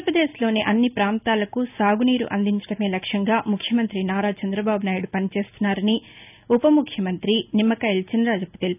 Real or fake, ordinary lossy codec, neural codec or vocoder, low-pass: real; none; none; 3.6 kHz